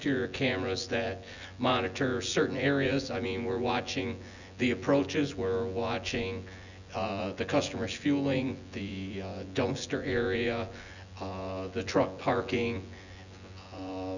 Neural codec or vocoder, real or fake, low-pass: vocoder, 24 kHz, 100 mel bands, Vocos; fake; 7.2 kHz